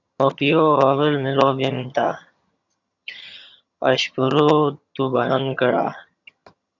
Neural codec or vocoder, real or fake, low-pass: vocoder, 22.05 kHz, 80 mel bands, HiFi-GAN; fake; 7.2 kHz